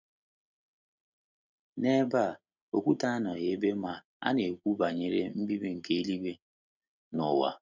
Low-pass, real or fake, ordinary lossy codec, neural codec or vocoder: 7.2 kHz; real; none; none